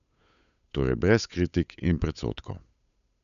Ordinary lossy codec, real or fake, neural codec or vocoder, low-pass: none; fake; codec, 16 kHz, 8 kbps, FunCodec, trained on Chinese and English, 25 frames a second; 7.2 kHz